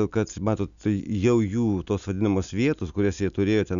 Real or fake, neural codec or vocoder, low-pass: real; none; 7.2 kHz